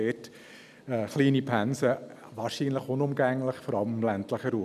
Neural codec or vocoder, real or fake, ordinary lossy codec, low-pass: none; real; none; 14.4 kHz